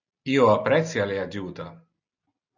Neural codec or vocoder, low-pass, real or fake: none; 7.2 kHz; real